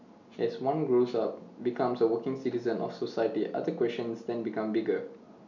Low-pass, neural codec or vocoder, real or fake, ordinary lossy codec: 7.2 kHz; none; real; none